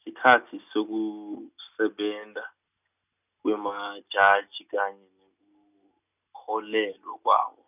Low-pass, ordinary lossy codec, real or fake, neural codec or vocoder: 3.6 kHz; none; real; none